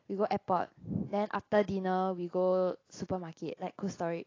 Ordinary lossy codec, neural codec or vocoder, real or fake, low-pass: AAC, 32 kbps; none; real; 7.2 kHz